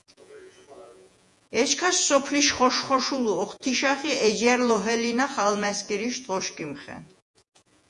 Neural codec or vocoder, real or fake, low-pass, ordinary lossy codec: vocoder, 48 kHz, 128 mel bands, Vocos; fake; 10.8 kHz; MP3, 64 kbps